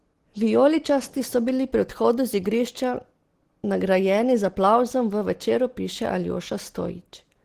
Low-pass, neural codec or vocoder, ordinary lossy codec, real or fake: 14.4 kHz; none; Opus, 16 kbps; real